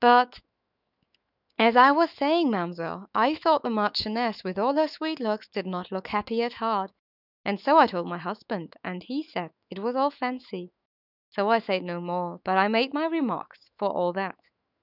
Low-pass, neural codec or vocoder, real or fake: 5.4 kHz; autoencoder, 48 kHz, 128 numbers a frame, DAC-VAE, trained on Japanese speech; fake